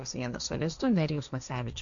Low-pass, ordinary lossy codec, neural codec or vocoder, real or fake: 7.2 kHz; MP3, 96 kbps; codec, 16 kHz, 1.1 kbps, Voila-Tokenizer; fake